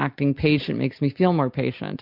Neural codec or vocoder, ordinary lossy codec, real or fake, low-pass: none; MP3, 48 kbps; real; 5.4 kHz